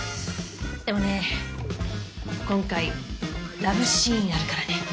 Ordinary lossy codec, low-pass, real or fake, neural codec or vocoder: none; none; real; none